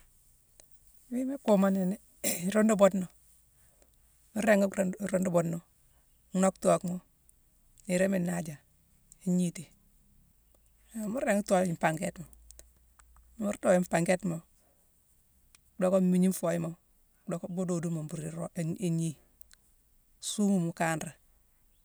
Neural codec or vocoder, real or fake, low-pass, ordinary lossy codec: none; real; none; none